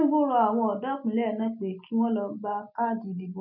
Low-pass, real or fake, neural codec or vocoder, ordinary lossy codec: 5.4 kHz; real; none; none